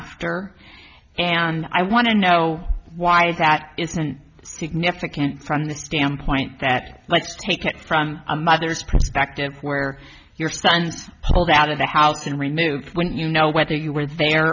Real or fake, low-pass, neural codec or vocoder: real; 7.2 kHz; none